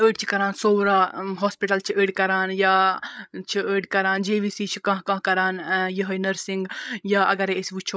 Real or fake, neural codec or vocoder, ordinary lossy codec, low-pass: fake; codec, 16 kHz, 16 kbps, FreqCodec, larger model; none; none